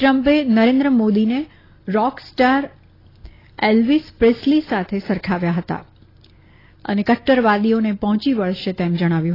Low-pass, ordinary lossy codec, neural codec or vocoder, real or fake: 5.4 kHz; AAC, 24 kbps; none; real